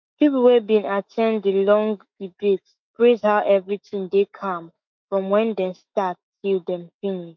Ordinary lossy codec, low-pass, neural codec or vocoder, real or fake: MP3, 48 kbps; 7.2 kHz; autoencoder, 48 kHz, 128 numbers a frame, DAC-VAE, trained on Japanese speech; fake